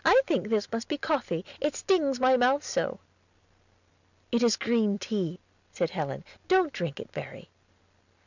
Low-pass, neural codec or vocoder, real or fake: 7.2 kHz; none; real